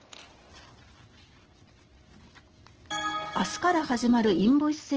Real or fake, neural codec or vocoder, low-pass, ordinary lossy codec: real; none; 7.2 kHz; Opus, 16 kbps